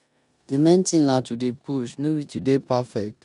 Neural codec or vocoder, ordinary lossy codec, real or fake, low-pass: codec, 16 kHz in and 24 kHz out, 0.9 kbps, LongCat-Audio-Codec, four codebook decoder; none; fake; 10.8 kHz